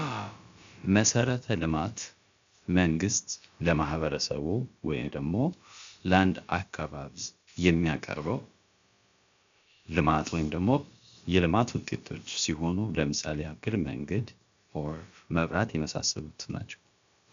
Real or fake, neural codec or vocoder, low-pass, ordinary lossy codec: fake; codec, 16 kHz, about 1 kbps, DyCAST, with the encoder's durations; 7.2 kHz; MP3, 64 kbps